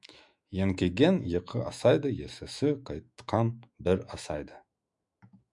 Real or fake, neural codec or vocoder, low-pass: fake; autoencoder, 48 kHz, 128 numbers a frame, DAC-VAE, trained on Japanese speech; 10.8 kHz